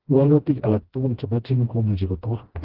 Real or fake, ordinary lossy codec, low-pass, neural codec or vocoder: fake; Opus, 16 kbps; 5.4 kHz; codec, 16 kHz, 1 kbps, FreqCodec, smaller model